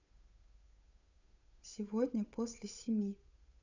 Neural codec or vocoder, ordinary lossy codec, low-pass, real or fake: codec, 16 kHz, 8 kbps, FunCodec, trained on Chinese and English, 25 frames a second; none; 7.2 kHz; fake